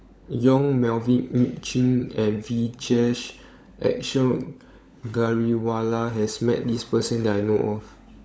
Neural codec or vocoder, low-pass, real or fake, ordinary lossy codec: codec, 16 kHz, 16 kbps, FunCodec, trained on LibriTTS, 50 frames a second; none; fake; none